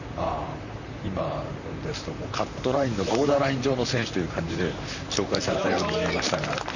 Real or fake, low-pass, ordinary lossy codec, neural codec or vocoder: fake; 7.2 kHz; none; vocoder, 44.1 kHz, 128 mel bands, Pupu-Vocoder